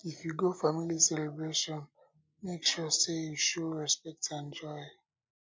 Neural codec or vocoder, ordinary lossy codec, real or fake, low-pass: none; none; real; none